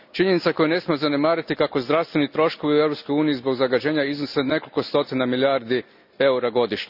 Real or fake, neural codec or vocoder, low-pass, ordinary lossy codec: real; none; 5.4 kHz; none